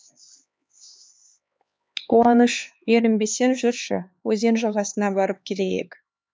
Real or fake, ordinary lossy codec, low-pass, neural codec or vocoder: fake; none; none; codec, 16 kHz, 4 kbps, X-Codec, HuBERT features, trained on LibriSpeech